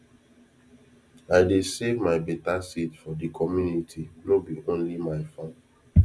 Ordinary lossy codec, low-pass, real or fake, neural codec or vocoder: none; none; real; none